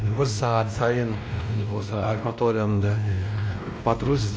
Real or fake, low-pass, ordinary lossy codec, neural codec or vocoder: fake; none; none; codec, 16 kHz, 1 kbps, X-Codec, WavLM features, trained on Multilingual LibriSpeech